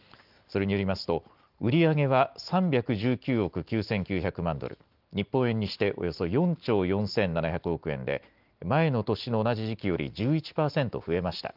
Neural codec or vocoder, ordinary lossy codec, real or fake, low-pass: none; Opus, 32 kbps; real; 5.4 kHz